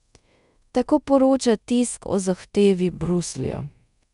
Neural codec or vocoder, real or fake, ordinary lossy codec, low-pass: codec, 24 kHz, 0.5 kbps, DualCodec; fake; none; 10.8 kHz